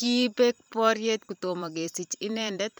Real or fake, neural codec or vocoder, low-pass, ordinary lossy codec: fake; vocoder, 44.1 kHz, 128 mel bands every 512 samples, BigVGAN v2; none; none